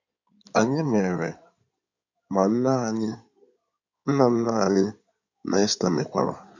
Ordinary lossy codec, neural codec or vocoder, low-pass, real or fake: none; codec, 16 kHz in and 24 kHz out, 2.2 kbps, FireRedTTS-2 codec; 7.2 kHz; fake